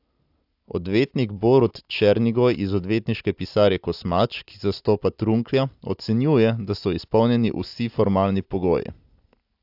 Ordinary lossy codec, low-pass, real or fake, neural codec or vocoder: AAC, 48 kbps; 5.4 kHz; real; none